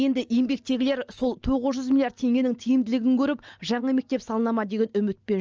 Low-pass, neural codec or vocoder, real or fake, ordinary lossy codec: 7.2 kHz; none; real; Opus, 24 kbps